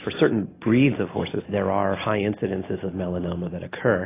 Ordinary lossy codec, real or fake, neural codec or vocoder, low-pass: AAC, 16 kbps; real; none; 3.6 kHz